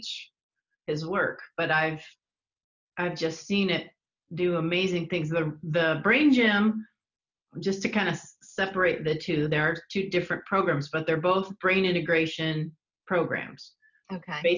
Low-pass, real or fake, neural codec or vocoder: 7.2 kHz; real; none